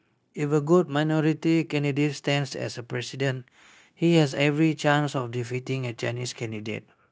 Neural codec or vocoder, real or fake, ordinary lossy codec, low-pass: codec, 16 kHz, 0.9 kbps, LongCat-Audio-Codec; fake; none; none